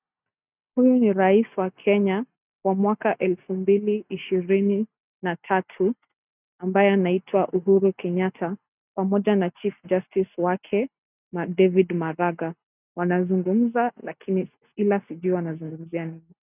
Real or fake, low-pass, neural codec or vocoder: real; 3.6 kHz; none